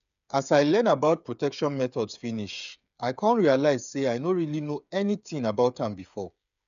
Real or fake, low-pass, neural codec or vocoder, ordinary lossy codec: fake; 7.2 kHz; codec, 16 kHz, 8 kbps, FreqCodec, smaller model; none